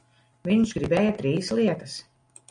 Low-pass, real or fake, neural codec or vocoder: 9.9 kHz; real; none